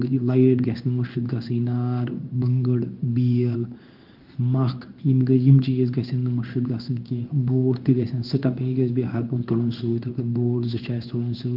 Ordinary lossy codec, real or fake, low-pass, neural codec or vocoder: Opus, 32 kbps; fake; 5.4 kHz; codec, 16 kHz in and 24 kHz out, 1 kbps, XY-Tokenizer